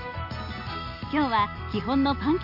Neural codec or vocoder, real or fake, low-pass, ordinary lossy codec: none; real; 5.4 kHz; none